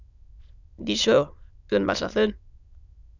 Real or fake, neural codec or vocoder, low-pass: fake; autoencoder, 22.05 kHz, a latent of 192 numbers a frame, VITS, trained on many speakers; 7.2 kHz